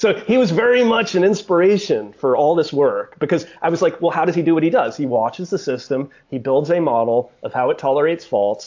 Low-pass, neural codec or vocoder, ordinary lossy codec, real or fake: 7.2 kHz; none; AAC, 48 kbps; real